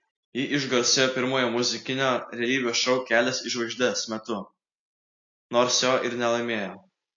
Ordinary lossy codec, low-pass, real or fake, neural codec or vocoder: AAC, 48 kbps; 7.2 kHz; real; none